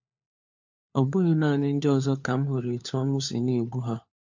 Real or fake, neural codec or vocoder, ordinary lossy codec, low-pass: fake; codec, 16 kHz, 4 kbps, FunCodec, trained on LibriTTS, 50 frames a second; MP3, 48 kbps; 7.2 kHz